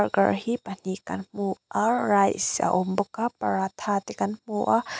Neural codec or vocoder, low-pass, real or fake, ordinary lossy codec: none; none; real; none